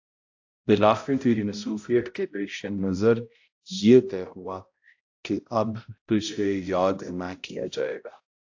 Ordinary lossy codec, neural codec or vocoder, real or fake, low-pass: AAC, 48 kbps; codec, 16 kHz, 0.5 kbps, X-Codec, HuBERT features, trained on balanced general audio; fake; 7.2 kHz